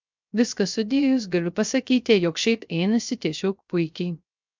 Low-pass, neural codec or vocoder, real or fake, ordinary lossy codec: 7.2 kHz; codec, 16 kHz, 0.3 kbps, FocalCodec; fake; MP3, 64 kbps